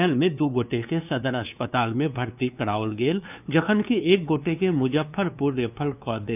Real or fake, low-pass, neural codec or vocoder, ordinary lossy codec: fake; 3.6 kHz; codec, 16 kHz, 2 kbps, FunCodec, trained on LibriTTS, 25 frames a second; none